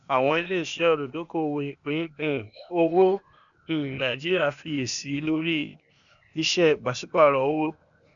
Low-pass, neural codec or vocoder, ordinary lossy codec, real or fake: 7.2 kHz; codec, 16 kHz, 0.8 kbps, ZipCodec; none; fake